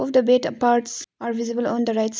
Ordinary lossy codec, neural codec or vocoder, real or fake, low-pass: none; none; real; none